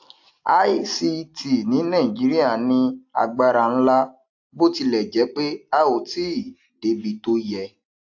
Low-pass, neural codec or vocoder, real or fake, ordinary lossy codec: 7.2 kHz; none; real; AAC, 48 kbps